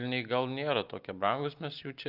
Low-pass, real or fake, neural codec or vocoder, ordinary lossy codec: 5.4 kHz; real; none; Opus, 24 kbps